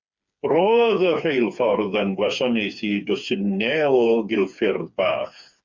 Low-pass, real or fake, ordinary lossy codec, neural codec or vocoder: 7.2 kHz; fake; Opus, 64 kbps; codec, 16 kHz, 4 kbps, FreqCodec, smaller model